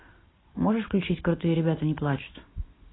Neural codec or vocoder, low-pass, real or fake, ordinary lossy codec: none; 7.2 kHz; real; AAC, 16 kbps